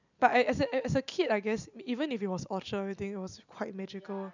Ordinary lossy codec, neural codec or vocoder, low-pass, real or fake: none; none; 7.2 kHz; real